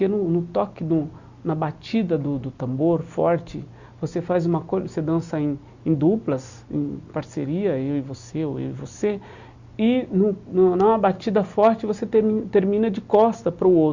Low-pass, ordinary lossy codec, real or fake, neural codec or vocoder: 7.2 kHz; Opus, 64 kbps; real; none